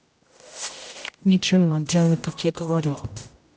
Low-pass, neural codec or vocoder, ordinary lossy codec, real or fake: none; codec, 16 kHz, 0.5 kbps, X-Codec, HuBERT features, trained on general audio; none; fake